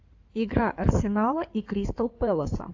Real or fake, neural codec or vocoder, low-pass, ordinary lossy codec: fake; codec, 16 kHz in and 24 kHz out, 2.2 kbps, FireRedTTS-2 codec; 7.2 kHz; AAC, 48 kbps